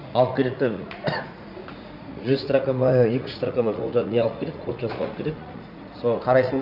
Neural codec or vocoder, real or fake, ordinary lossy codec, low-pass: vocoder, 44.1 kHz, 80 mel bands, Vocos; fake; none; 5.4 kHz